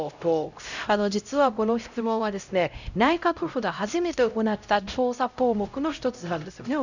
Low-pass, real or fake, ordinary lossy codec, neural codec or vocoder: 7.2 kHz; fake; none; codec, 16 kHz, 0.5 kbps, X-Codec, HuBERT features, trained on LibriSpeech